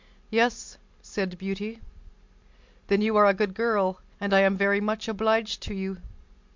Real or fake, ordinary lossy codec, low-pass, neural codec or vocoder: real; MP3, 64 kbps; 7.2 kHz; none